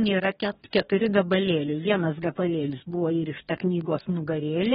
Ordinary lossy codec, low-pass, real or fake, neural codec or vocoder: AAC, 16 kbps; 19.8 kHz; fake; codec, 44.1 kHz, 2.6 kbps, DAC